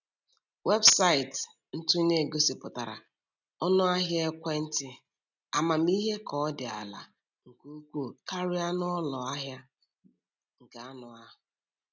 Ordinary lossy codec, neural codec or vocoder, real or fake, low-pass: none; none; real; 7.2 kHz